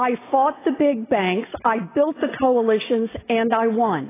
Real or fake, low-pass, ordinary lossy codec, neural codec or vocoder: real; 3.6 kHz; AAC, 16 kbps; none